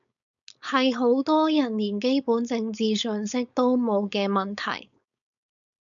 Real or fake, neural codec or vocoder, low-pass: fake; codec, 16 kHz, 4.8 kbps, FACodec; 7.2 kHz